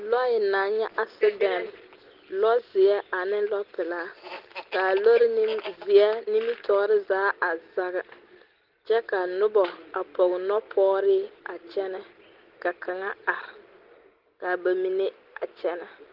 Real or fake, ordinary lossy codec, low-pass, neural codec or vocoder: real; Opus, 16 kbps; 5.4 kHz; none